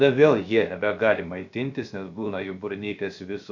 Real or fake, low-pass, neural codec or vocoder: fake; 7.2 kHz; codec, 16 kHz, 0.7 kbps, FocalCodec